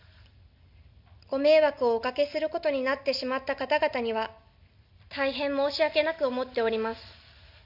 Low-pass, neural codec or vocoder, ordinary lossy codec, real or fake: 5.4 kHz; none; none; real